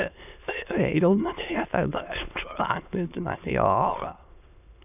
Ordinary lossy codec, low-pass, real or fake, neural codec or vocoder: none; 3.6 kHz; fake; autoencoder, 22.05 kHz, a latent of 192 numbers a frame, VITS, trained on many speakers